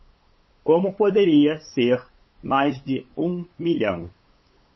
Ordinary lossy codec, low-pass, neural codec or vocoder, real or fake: MP3, 24 kbps; 7.2 kHz; codec, 16 kHz, 8 kbps, FunCodec, trained on LibriTTS, 25 frames a second; fake